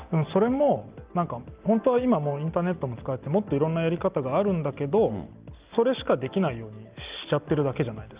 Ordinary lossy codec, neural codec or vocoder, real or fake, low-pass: Opus, 32 kbps; none; real; 3.6 kHz